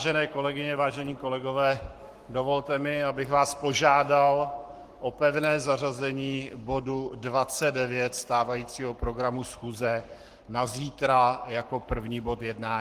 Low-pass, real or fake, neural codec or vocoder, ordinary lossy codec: 14.4 kHz; fake; codec, 44.1 kHz, 7.8 kbps, Pupu-Codec; Opus, 24 kbps